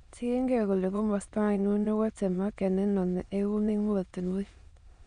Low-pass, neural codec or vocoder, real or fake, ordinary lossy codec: 9.9 kHz; autoencoder, 22.05 kHz, a latent of 192 numbers a frame, VITS, trained on many speakers; fake; none